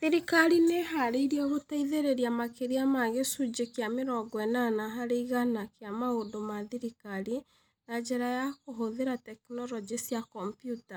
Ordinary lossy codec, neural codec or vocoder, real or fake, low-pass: none; none; real; none